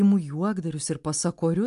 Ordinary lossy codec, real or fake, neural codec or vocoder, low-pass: MP3, 64 kbps; real; none; 10.8 kHz